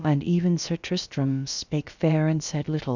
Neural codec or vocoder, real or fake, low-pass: codec, 16 kHz, about 1 kbps, DyCAST, with the encoder's durations; fake; 7.2 kHz